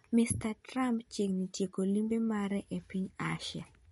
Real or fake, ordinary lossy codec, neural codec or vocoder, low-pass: real; MP3, 48 kbps; none; 19.8 kHz